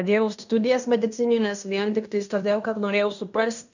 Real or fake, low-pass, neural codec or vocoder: fake; 7.2 kHz; codec, 16 kHz in and 24 kHz out, 0.9 kbps, LongCat-Audio-Codec, fine tuned four codebook decoder